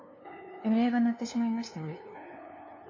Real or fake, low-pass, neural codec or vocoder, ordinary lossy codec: fake; 7.2 kHz; codec, 16 kHz, 2 kbps, FunCodec, trained on LibriTTS, 25 frames a second; MP3, 32 kbps